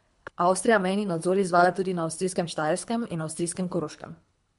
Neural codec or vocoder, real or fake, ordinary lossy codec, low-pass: codec, 24 kHz, 3 kbps, HILCodec; fake; MP3, 64 kbps; 10.8 kHz